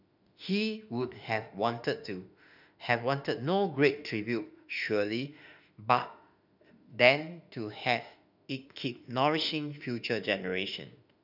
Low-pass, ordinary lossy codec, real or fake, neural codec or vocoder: 5.4 kHz; none; fake; autoencoder, 48 kHz, 32 numbers a frame, DAC-VAE, trained on Japanese speech